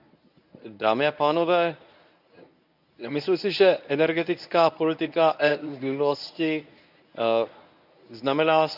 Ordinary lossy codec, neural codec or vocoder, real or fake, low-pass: none; codec, 24 kHz, 0.9 kbps, WavTokenizer, medium speech release version 1; fake; 5.4 kHz